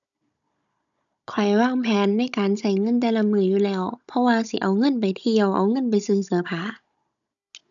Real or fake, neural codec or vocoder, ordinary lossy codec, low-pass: fake; codec, 16 kHz, 16 kbps, FunCodec, trained on Chinese and English, 50 frames a second; none; 7.2 kHz